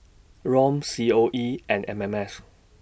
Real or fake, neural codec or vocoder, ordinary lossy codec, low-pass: real; none; none; none